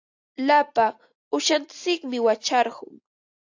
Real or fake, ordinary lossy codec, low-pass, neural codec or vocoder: real; AAC, 48 kbps; 7.2 kHz; none